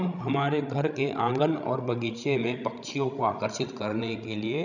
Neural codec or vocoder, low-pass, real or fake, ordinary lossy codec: codec, 16 kHz, 16 kbps, FreqCodec, larger model; 7.2 kHz; fake; none